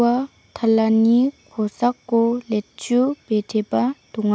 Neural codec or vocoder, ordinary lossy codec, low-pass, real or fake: none; none; none; real